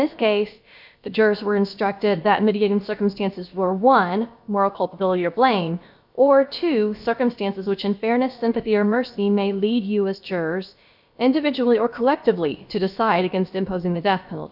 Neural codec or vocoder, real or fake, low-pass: codec, 16 kHz, about 1 kbps, DyCAST, with the encoder's durations; fake; 5.4 kHz